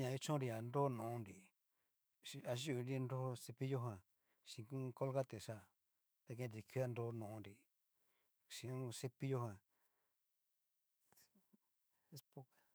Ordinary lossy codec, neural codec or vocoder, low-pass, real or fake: none; autoencoder, 48 kHz, 128 numbers a frame, DAC-VAE, trained on Japanese speech; none; fake